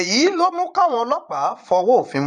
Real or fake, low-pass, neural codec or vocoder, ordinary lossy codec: fake; 9.9 kHz; vocoder, 22.05 kHz, 80 mel bands, Vocos; none